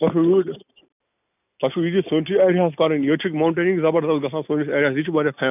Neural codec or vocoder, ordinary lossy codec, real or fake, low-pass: none; none; real; 3.6 kHz